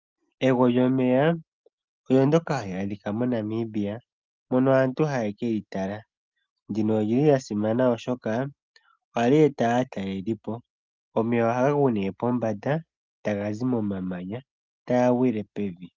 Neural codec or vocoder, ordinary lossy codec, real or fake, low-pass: none; Opus, 32 kbps; real; 7.2 kHz